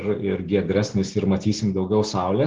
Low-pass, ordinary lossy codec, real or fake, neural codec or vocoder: 7.2 kHz; Opus, 16 kbps; real; none